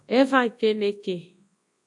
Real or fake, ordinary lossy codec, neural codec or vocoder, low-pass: fake; AAC, 64 kbps; codec, 24 kHz, 0.9 kbps, WavTokenizer, large speech release; 10.8 kHz